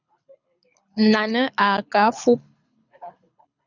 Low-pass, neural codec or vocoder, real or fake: 7.2 kHz; codec, 24 kHz, 6 kbps, HILCodec; fake